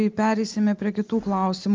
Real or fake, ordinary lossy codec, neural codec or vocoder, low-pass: real; Opus, 32 kbps; none; 7.2 kHz